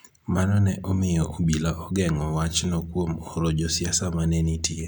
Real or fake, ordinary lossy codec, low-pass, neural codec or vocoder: real; none; none; none